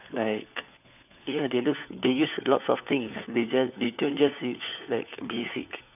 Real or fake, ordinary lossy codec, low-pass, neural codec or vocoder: fake; none; 3.6 kHz; codec, 16 kHz, 4 kbps, FunCodec, trained on LibriTTS, 50 frames a second